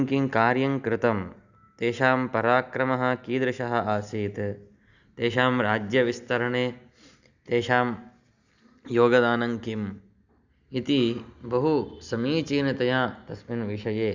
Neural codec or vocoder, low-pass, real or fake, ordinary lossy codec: none; 7.2 kHz; real; Opus, 64 kbps